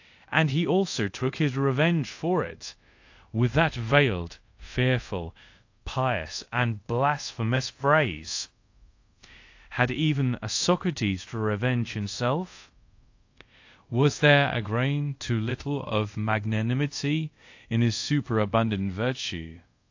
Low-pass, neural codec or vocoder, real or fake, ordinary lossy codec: 7.2 kHz; codec, 24 kHz, 0.5 kbps, DualCodec; fake; AAC, 48 kbps